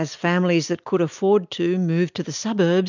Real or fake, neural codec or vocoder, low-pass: real; none; 7.2 kHz